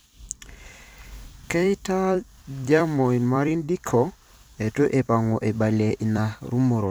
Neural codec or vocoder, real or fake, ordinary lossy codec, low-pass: vocoder, 44.1 kHz, 128 mel bands every 256 samples, BigVGAN v2; fake; none; none